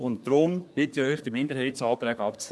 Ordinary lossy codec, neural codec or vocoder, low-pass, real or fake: none; codec, 24 kHz, 1 kbps, SNAC; none; fake